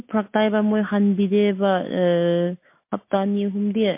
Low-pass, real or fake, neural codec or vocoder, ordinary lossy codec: 3.6 kHz; real; none; MP3, 24 kbps